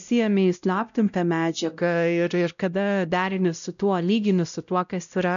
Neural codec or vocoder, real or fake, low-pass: codec, 16 kHz, 0.5 kbps, X-Codec, WavLM features, trained on Multilingual LibriSpeech; fake; 7.2 kHz